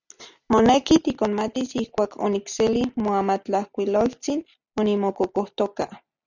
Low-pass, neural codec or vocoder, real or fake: 7.2 kHz; none; real